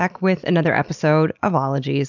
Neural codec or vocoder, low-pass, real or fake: none; 7.2 kHz; real